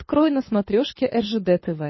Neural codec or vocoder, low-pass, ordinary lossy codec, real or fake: codec, 24 kHz, 6 kbps, HILCodec; 7.2 kHz; MP3, 24 kbps; fake